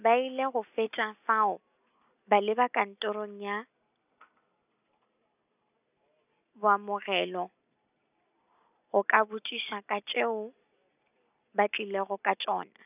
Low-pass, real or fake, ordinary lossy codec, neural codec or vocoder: 3.6 kHz; real; none; none